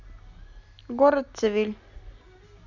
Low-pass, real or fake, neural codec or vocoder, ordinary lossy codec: 7.2 kHz; real; none; none